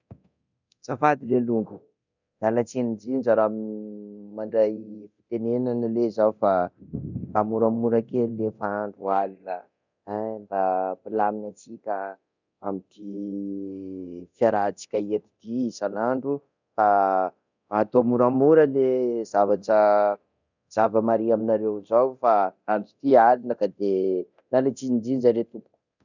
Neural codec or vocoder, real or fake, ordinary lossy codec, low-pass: codec, 24 kHz, 0.9 kbps, DualCodec; fake; none; 7.2 kHz